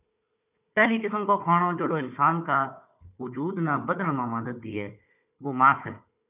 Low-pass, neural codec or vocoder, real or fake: 3.6 kHz; codec, 16 kHz, 4 kbps, FunCodec, trained on Chinese and English, 50 frames a second; fake